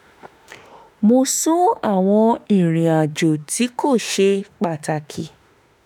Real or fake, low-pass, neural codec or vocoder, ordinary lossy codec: fake; none; autoencoder, 48 kHz, 32 numbers a frame, DAC-VAE, trained on Japanese speech; none